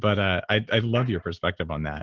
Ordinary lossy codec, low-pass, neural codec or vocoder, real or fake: Opus, 16 kbps; 7.2 kHz; none; real